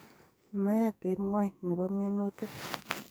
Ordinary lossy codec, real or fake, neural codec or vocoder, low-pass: none; fake; codec, 44.1 kHz, 2.6 kbps, SNAC; none